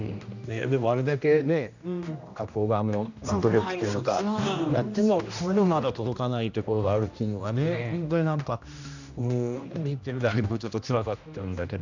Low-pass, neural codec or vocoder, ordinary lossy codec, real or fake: 7.2 kHz; codec, 16 kHz, 1 kbps, X-Codec, HuBERT features, trained on general audio; none; fake